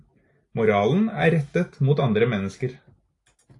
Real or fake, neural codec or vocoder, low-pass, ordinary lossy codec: real; none; 10.8 kHz; AAC, 48 kbps